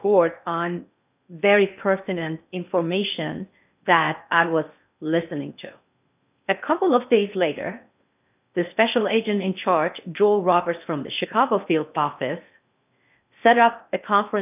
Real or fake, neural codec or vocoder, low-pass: fake; codec, 16 kHz in and 24 kHz out, 0.6 kbps, FocalCodec, streaming, 2048 codes; 3.6 kHz